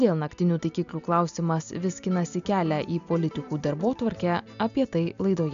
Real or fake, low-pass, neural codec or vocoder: real; 7.2 kHz; none